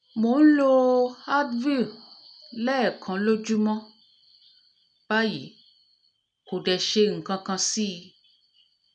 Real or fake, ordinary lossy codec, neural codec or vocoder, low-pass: real; none; none; 9.9 kHz